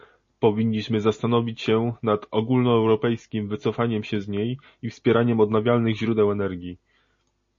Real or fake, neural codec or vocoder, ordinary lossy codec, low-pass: real; none; MP3, 32 kbps; 7.2 kHz